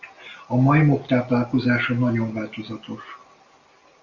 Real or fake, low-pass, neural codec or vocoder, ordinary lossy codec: real; 7.2 kHz; none; Opus, 64 kbps